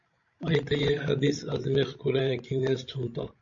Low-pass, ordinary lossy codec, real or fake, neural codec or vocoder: 7.2 kHz; AAC, 48 kbps; fake; codec, 16 kHz, 16 kbps, FreqCodec, larger model